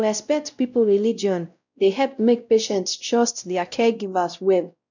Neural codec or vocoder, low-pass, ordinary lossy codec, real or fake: codec, 16 kHz, 0.5 kbps, X-Codec, WavLM features, trained on Multilingual LibriSpeech; 7.2 kHz; none; fake